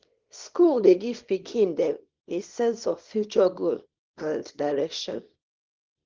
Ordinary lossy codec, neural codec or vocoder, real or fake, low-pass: Opus, 16 kbps; codec, 24 kHz, 0.9 kbps, WavTokenizer, small release; fake; 7.2 kHz